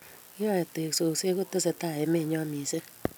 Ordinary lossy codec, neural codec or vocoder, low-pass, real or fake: none; none; none; real